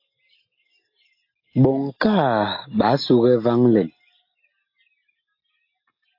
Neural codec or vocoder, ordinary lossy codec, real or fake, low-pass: none; AAC, 32 kbps; real; 5.4 kHz